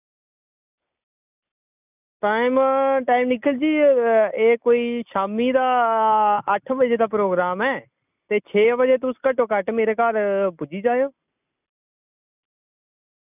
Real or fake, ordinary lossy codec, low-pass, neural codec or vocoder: real; none; 3.6 kHz; none